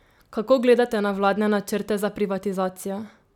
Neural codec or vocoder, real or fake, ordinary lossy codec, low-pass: none; real; none; 19.8 kHz